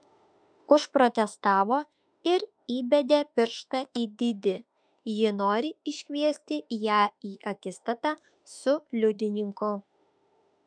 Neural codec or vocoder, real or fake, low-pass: autoencoder, 48 kHz, 32 numbers a frame, DAC-VAE, trained on Japanese speech; fake; 9.9 kHz